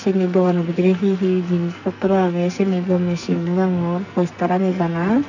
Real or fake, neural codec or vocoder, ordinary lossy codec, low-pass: fake; codec, 44.1 kHz, 2.6 kbps, SNAC; none; 7.2 kHz